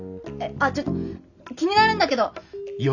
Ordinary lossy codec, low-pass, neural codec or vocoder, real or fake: none; 7.2 kHz; none; real